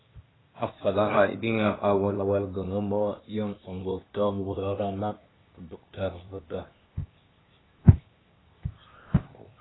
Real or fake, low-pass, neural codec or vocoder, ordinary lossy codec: fake; 7.2 kHz; codec, 16 kHz, 0.8 kbps, ZipCodec; AAC, 16 kbps